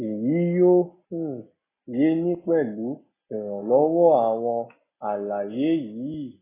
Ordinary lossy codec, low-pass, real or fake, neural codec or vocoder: AAC, 16 kbps; 3.6 kHz; real; none